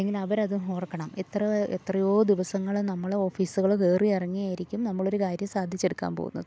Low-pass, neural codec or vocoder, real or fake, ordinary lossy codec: none; none; real; none